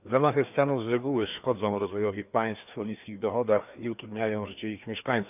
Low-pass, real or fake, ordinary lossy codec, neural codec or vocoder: 3.6 kHz; fake; none; codec, 16 kHz, 2 kbps, FreqCodec, larger model